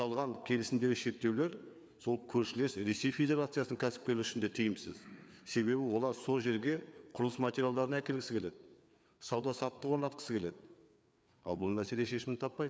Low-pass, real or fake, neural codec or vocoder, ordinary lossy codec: none; fake; codec, 16 kHz, 4 kbps, FreqCodec, larger model; none